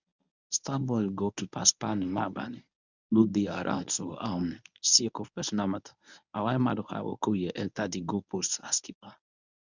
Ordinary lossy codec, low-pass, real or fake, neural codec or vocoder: none; 7.2 kHz; fake; codec, 24 kHz, 0.9 kbps, WavTokenizer, medium speech release version 1